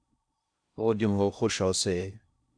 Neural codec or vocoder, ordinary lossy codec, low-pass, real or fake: codec, 16 kHz in and 24 kHz out, 0.6 kbps, FocalCodec, streaming, 4096 codes; AAC, 64 kbps; 9.9 kHz; fake